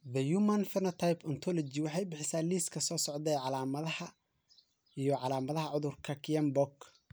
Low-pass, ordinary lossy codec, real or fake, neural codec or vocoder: none; none; real; none